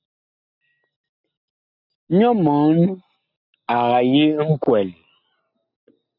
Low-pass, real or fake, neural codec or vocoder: 5.4 kHz; real; none